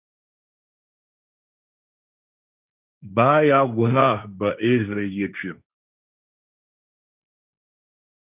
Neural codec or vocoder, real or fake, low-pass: codec, 16 kHz, 1.1 kbps, Voila-Tokenizer; fake; 3.6 kHz